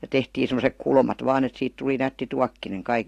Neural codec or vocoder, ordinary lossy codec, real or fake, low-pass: none; AAC, 48 kbps; real; 14.4 kHz